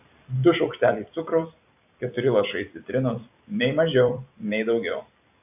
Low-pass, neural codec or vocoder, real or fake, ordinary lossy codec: 3.6 kHz; vocoder, 44.1 kHz, 128 mel bands every 256 samples, BigVGAN v2; fake; AAC, 32 kbps